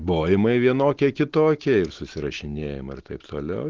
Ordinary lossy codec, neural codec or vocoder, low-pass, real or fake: Opus, 16 kbps; none; 7.2 kHz; real